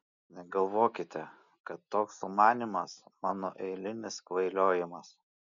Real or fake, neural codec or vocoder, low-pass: real; none; 7.2 kHz